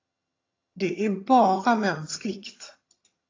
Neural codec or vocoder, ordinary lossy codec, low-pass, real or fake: vocoder, 22.05 kHz, 80 mel bands, HiFi-GAN; AAC, 32 kbps; 7.2 kHz; fake